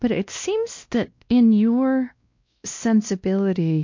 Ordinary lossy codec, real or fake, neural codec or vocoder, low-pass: MP3, 48 kbps; fake; codec, 16 kHz, 1 kbps, X-Codec, WavLM features, trained on Multilingual LibriSpeech; 7.2 kHz